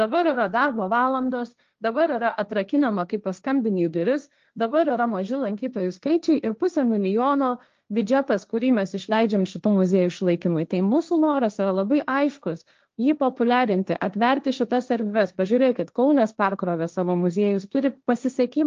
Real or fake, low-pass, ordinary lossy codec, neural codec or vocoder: fake; 7.2 kHz; Opus, 24 kbps; codec, 16 kHz, 1.1 kbps, Voila-Tokenizer